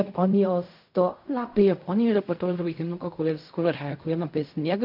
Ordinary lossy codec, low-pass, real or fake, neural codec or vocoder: MP3, 48 kbps; 5.4 kHz; fake; codec, 16 kHz in and 24 kHz out, 0.4 kbps, LongCat-Audio-Codec, fine tuned four codebook decoder